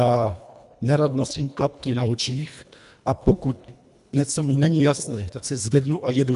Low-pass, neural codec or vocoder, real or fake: 10.8 kHz; codec, 24 kHz, 1.5 kbps, HILCodec; fake